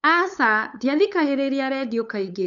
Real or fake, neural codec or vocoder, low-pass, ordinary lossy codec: fake; codec, 16 kHz, 8 kbps, FunCodec, trained on LibriTTS, 25 frames a second; 7.2 kHz; none